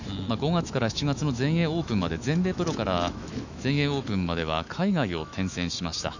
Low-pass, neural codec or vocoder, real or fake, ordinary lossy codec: 7.2 kHz; autoencoder, 48 kHz, 128 numbers a frame, DAC-VAE, trained on Japanese speech; fake; none